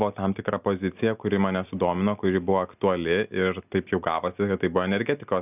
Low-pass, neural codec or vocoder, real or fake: 3.6 kHz; none; real